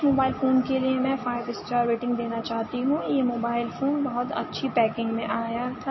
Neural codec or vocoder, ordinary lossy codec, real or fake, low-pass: none; MP3, 24 kbps; real; 7.2 kHz